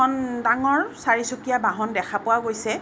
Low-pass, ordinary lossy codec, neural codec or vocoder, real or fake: none; none; none; real